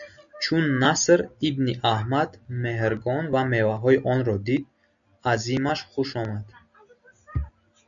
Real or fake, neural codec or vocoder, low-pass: real; none; 7.2 kHz